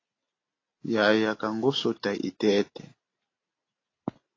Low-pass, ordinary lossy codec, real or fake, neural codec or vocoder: 7.2 kHz; AAC, 32 kbps; real; none